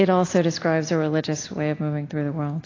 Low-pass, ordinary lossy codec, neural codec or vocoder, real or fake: 7.2 kHz; AAC, 32 kbps; none; real